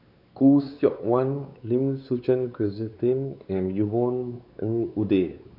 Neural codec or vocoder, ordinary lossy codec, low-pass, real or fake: codec, 16 kHz, 4 kbps, X-Codec, WavLM features, trained on Multilingual LibriSpeech; none; 5.4 kHz; fake